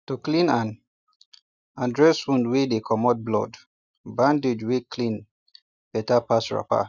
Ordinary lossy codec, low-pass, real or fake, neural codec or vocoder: none; 7.2 kHz; real; none